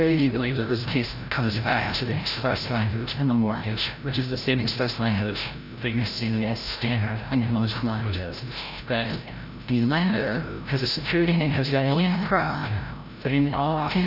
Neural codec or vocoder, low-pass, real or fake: codec, 16 kHz, 0.5 kbps, FreqCodec, larger model; 5.4 kHz; fake